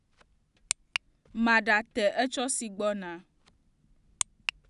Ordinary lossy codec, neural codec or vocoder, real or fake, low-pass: none; none; real; 10.8 kHz